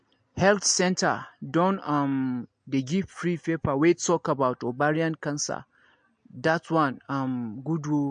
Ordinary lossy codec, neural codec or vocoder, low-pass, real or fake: MP3, 48 kbps; none; 9.9 kHz; real